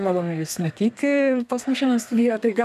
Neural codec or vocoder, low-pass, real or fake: codec, 32 kHz, 1.9 kbps, SNAC; 14.4 kHz; fake